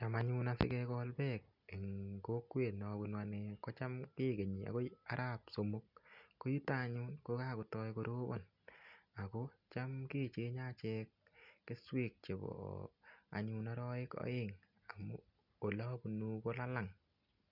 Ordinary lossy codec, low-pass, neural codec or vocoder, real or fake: none; 5.4 kHz; none; real